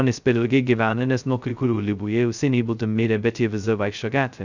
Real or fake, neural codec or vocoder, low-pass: fake; codec, 16 kHz, 0.2 kbps, FocalCodec; 7.2 kHz